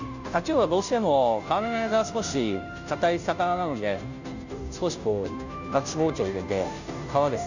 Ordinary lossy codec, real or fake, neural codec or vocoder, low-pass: none; fake; codec, 16 kHz, 0.5 kbps, FunCodec, trained on Chinese and English, 25 frames a second; 7.2 kHz